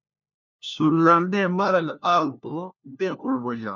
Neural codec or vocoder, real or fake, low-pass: codec, 16 kHz, 1 kbps, FunCodec, trained on LibriTTS, 50 frames a second; fake; 7.2 kHz